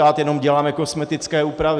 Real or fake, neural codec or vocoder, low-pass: real; none; 9.9 kHz